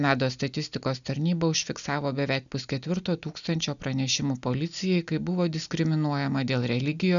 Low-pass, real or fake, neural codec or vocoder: 7.2 kHz; real; none